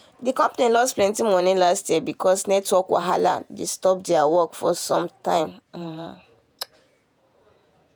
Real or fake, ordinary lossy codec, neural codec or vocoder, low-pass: fake; none; vocoder, 48 kHz, 128 mel bands, Vocos; none